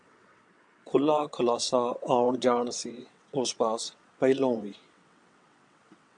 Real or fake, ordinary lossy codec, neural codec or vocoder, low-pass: fake; AAC, 64 kbps; vocoder, 22.05 kHz, 80 mel bands, WaveNeXt; 9.9 kHz